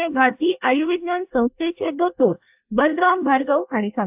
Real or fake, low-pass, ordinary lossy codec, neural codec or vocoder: fake; 3.6 kHz; AAC, 32 kbps; codec, 24 kHz, 1 kbps, SNAC